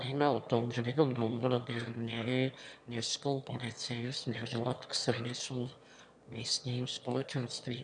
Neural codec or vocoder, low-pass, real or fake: autoencoder, 22.05 kHz, a latent of 192 numbers a frame, VITS, trained on one speaker; 9.9 kHz; fake